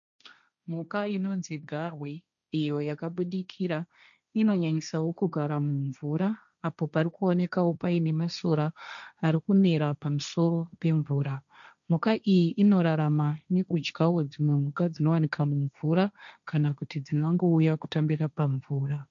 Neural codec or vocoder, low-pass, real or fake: codec, 16 kHz, 1.1 kbps, Voila-Tokenizer; 7.2 kHz; fake